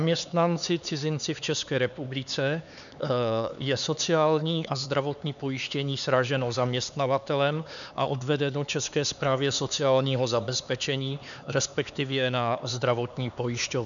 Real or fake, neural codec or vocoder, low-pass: fake; codec, 16 kHz, 4 kbps, X-Codec, HuBERT features, trained on LibriSpeech; 7.2 kHz